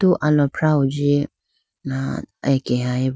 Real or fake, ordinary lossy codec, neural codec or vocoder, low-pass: real; none; none; none